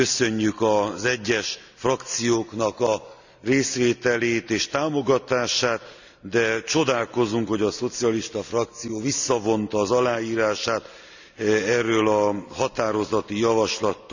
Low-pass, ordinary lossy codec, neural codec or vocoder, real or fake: 7.2 kHz; none; none; real